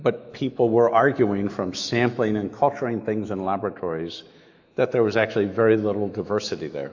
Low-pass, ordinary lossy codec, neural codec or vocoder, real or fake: 7.2 kHz; AAC, 48 kbps; autoencoder, 48 kHz, 128 numbers a frame, DAC-VAE, trained on Japanese speech; fake